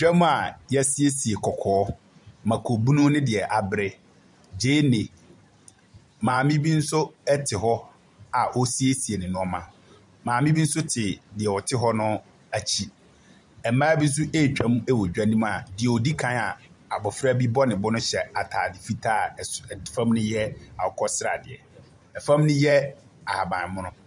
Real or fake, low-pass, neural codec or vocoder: fake; 10.8 kHz; vocoder, 44.1 kHz, 128 mel bands every 512 samples, BigVGAN v2